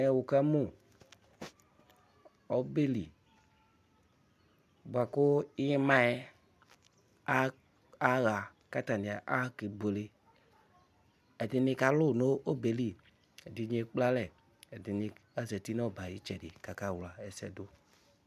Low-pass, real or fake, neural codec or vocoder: 14.4 kHz; real; none